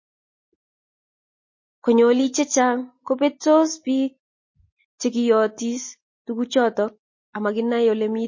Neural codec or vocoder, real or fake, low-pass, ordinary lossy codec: none; real; 7.2 kHz; MP3, 32 kbps